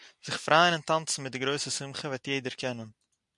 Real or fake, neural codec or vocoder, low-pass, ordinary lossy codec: real; none; 10.8 kHz; MP3, 96 kbps